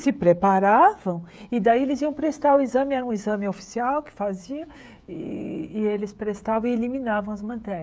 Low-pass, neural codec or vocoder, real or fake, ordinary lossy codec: none; codec, 16 kHz, 16 kbps, FreqCodec, smaller model; fake; none